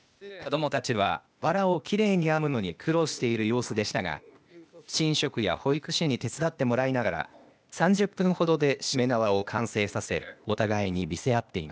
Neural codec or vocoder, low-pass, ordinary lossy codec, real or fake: codec, 16 kHz, 0.8 kbps, ZipCodec; none; none; fake